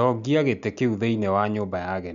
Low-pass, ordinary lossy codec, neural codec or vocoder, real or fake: 7.2 kHz; none; none; real